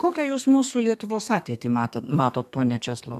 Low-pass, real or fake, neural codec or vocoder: 14.4 kHz; fake; codec, 32 kHz, 1.9 kbps, SNAC